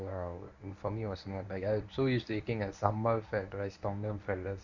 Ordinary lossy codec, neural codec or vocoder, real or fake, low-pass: none; codec, 24 kHz, 0.9 kbps, WavTokenizer, medium speech release version 1; fake; 7.2 kHz